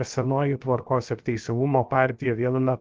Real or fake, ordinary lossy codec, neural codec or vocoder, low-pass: fake; Opus, 24 kbps; codec, 16 kHz, 0.7 kbps, FocalCodec; 7.2 kHz